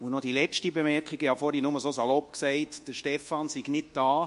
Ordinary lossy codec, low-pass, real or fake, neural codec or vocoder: MP3, 48 kbps; 10.8 kHz; fake; codec, 24 kHz, 1.2 kbps, DualCodec